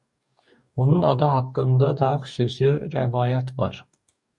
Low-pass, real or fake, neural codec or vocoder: 10.8 kHz; fake; codec, 44.1 kHz, 2.6 kbps, DAC